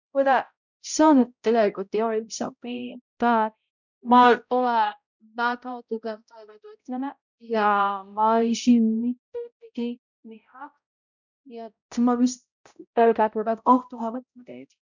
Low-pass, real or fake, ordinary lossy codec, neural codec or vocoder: 7.2 kHz; fake; none; codec, 16 kHz, 0.5 kbps, X-Codec, HuBERT features, trained on balanced general audio